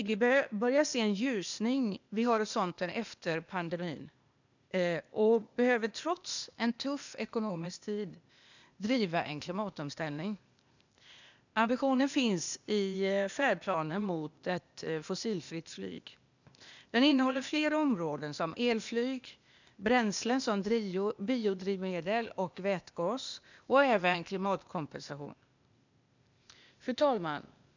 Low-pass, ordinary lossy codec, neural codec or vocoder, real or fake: 7.2 kHz; none; codec, 16 kHz, 0.8 kbps, ZipCodec; fake